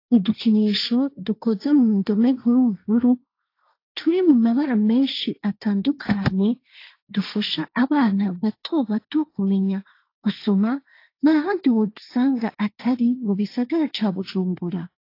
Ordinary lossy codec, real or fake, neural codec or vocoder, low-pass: AAC, 32 kbps; fake; codec, 16 kHz, 1.1 kbps, Voila-Tokenizer; 5.4 kHz